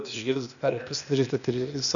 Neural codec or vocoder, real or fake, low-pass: codec, 16 kHz, 0.8 kbps, ZipCodec; fake; 7.2 kHz